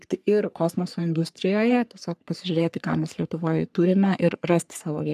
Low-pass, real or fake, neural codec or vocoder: 14.4 kHz; fake; codec, 44.1 kHz, 3.4 kbps, Pupu-Codec